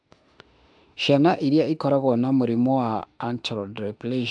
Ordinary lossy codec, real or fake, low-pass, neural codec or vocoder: AAC, 64 kbps; fake; 9.9 kHz; autoencoder, 48 kHz, 32 numbers a frame, DAC-VAE, trained on Japanese speech